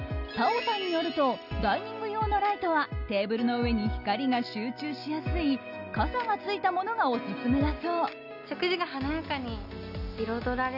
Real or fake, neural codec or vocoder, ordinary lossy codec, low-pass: real; none; none; 5.4 kHz